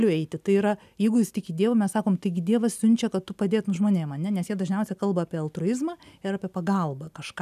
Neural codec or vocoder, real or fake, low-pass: none; real; 14.4 kHz